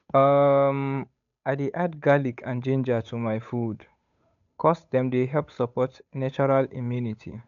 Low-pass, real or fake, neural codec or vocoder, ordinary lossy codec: 7.2 kHz; fake; codec, 16 kHz, 8 kbps, FunCodec, trained on Chinese and English, 25 frames a second; none